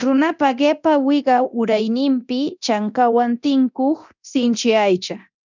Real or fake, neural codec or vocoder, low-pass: fake; codec, 24 kHz, 0.9 kbps, DualCodec; 7.2 kHz